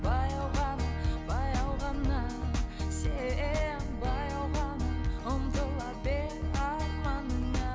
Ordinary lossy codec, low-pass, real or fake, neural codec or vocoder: none; none; real; none